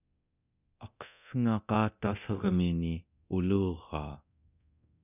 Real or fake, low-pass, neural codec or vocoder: fake; 3.6 kHz; codec, 24 kHz, 0.9 kbps, DualCodec